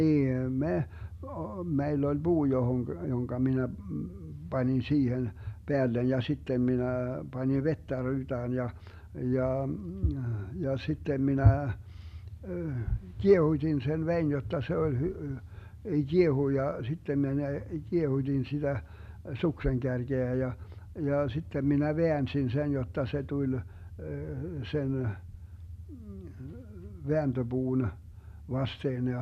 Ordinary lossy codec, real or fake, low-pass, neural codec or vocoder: none; real; 14.4 kHz; none